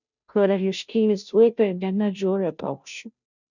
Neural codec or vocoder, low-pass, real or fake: codec, 16 kHz, 0.5 kbps, FunCodec, trained on Chinese and English, 25 frames a second; 7.2 kHz; fake